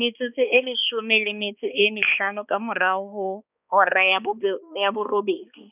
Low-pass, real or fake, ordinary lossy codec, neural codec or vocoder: 3.6 kHz; fake; none; codec, 16 kHz, 2 kbps, X-Codec, HuBERT features, trained on balanced general audio